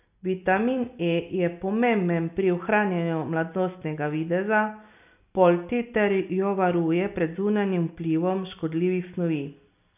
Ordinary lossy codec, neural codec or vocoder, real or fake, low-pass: none; none; real; 3.6 kHz